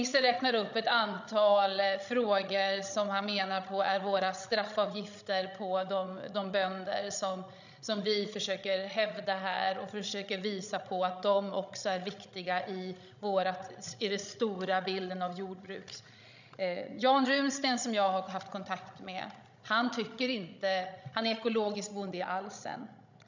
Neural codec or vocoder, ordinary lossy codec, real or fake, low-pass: codec, 16 kHz, 16 kbps, FreqCodec, larger model; none; fake; 7.2 kHz